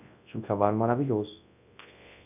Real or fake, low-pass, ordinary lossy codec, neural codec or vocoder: fake; 3.6 kHz; none; codec, 24 kHz, 0.9 kbps, WavTokenizer, large speech release